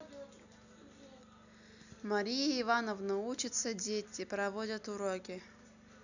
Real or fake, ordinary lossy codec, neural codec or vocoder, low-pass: real; none; none; 7.2 kHz